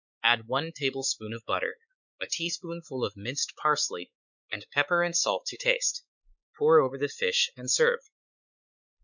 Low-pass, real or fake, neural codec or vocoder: 7.2 kHz; fake; codec, 16 kHz, 4 kbps, X-Codec, WavLM features, trained on Multilingual LibriSpeech